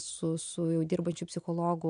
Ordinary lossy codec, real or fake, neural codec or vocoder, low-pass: MP3, 64 kbps; real; none; 9.9 kHz